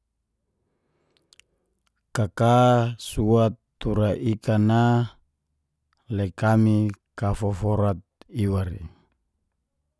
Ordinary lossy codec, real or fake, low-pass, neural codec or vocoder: none; real; none; none